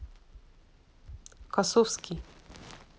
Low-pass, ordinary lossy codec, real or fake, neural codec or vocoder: none; none; real; none